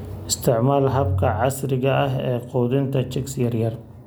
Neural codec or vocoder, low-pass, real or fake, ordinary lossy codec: none; none; real; none